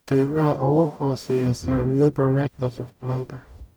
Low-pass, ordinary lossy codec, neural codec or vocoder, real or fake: none; none; codec, 44.1 kHz, 0.9 kbps, DAC; fake